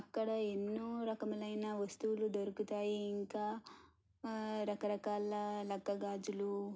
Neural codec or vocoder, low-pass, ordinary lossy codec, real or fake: none; none; none; real